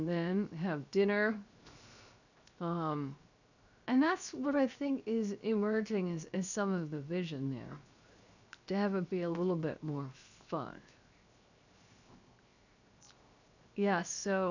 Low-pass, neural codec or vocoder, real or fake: 7.2 kHz; codec, 16 kHz, 0.7 kbps, FocalCodec; fake